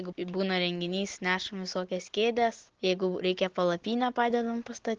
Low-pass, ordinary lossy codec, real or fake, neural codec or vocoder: 7.2 kHz; Opus, 16 kbps; real; none